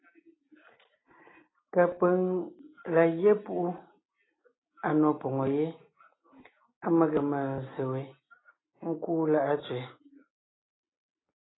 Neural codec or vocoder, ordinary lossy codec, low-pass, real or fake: none; AAC, 16 kbps; 7.2 kHz; real